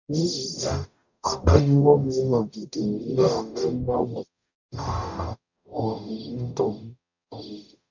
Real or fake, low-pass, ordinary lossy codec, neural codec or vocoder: fake; 7.2 kHz; none; codec, 44.1 kHz, 0.9 kbps, DAC